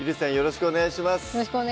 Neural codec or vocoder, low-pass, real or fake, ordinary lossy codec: none; none; real; none